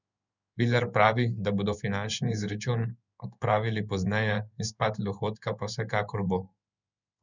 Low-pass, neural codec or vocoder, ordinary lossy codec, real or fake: 7.2 kHz; codec, 16 kHz in and 24 kHz out, 1 kbps, XY-Tokenizer; none; fake